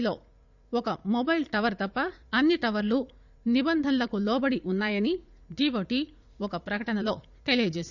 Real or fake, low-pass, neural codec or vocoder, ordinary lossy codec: fake; 7.2 kHz; vocoder, 44.1 kHz, 80 mel bands, Vocos; none